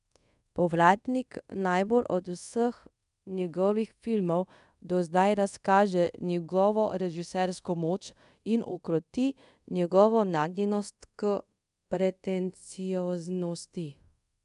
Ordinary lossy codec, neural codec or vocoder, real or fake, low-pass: none; codec, 24 kHz, 0.5 kbps, DualCodec; fake; 10.8 kHz